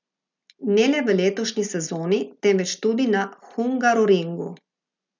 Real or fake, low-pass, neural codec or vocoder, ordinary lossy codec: real; 7.2 kHz; none; none